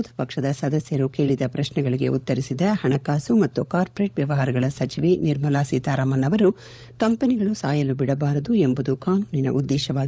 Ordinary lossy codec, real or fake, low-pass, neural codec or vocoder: none; fake; none; codec, 16 kHz, 16 kbps, FunCodec, trained on LibriTTS, 50 frames a second